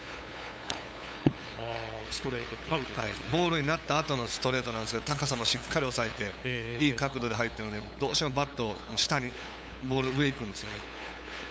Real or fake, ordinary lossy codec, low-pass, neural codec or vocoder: fake; none; none; codec, 16 kHz, 8 kbps, FunCodec, trained on LibriTTS, 25 frames a second